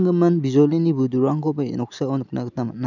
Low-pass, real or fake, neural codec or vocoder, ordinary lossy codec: 7.2 kHz; real; none; none